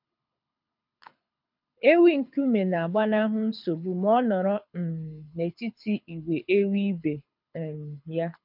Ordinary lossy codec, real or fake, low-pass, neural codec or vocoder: MP3, 48 kbps; fake; 5.4 kHz; codec, 24 kHz, 6 kbps, HILCodec